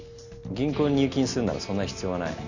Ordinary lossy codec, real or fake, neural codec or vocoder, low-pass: none; real; none; 7.2 kHz